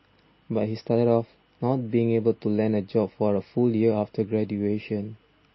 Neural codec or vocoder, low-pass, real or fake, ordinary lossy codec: none; 7.2 kHz; real; MP3, 24 kbps